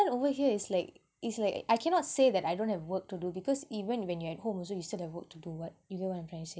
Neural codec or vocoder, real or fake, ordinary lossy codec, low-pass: none; real; none; none